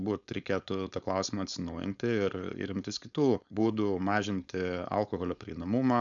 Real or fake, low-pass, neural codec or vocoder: fake; 7.2 kHz; codec, 16 kHz, 4.8 kbps, FACodec